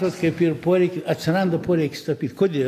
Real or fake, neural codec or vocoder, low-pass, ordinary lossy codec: real; none; 14.4 kHz; AAC, 64 kbps